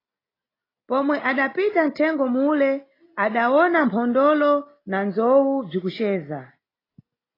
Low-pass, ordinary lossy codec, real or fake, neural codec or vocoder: 5.4 kHz; AAC, 24 kbps; real; none